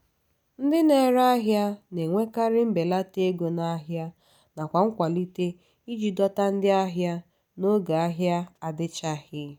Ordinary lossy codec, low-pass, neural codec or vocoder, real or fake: none; none; none; real